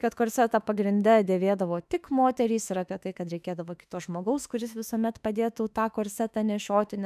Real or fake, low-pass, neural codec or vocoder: fake; 14.4 kHz; autoencoder, 48 kHz, 32 numbers a frame, DAC-VAE, trained on Japanese speech